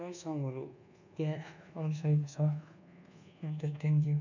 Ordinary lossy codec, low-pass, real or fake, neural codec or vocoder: none; 7.2 kHz; fake; codec, 24 kHz, 1.2 kbps, DualCodec